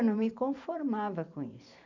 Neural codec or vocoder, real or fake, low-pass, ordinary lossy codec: vocoder, 44.1 kHz, 128 mel bands every 512 samples, BigVGAN v2; fake; 7.2 kHz; none